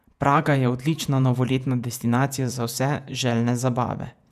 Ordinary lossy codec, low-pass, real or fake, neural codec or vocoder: none; 14.4 kHz; fake; vocoder, 48 kHz, 128 mel bands, Vocos